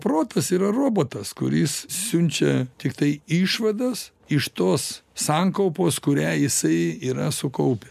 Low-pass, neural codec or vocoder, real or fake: 14.4 kHz; none; real